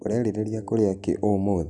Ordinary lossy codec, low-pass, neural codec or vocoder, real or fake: none; 10.8 kHz; none; real